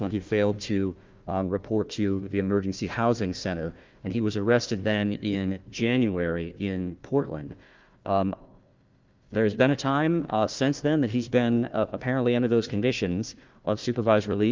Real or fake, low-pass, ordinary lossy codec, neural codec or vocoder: fake; 7.2 kHz; Opus, 24 kbps; codec, 16 kHz, 1 kbps, FunCodec, trained on Chinese and English, 50 frames a second